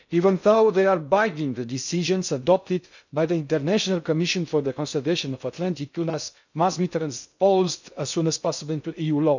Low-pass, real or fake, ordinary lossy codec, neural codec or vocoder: 7.2 kHz; fake; none; codec, 16 kHz in and 24 kHz out, 0.6 kbps, FocalCodec, streaming, 2048 codes